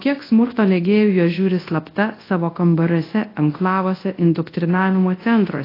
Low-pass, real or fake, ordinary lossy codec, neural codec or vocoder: 5.4 kHz; fake; AAC, 24 kbps; codec, 24 kHz, 0.9 kbps, WavTokenizer, large speech release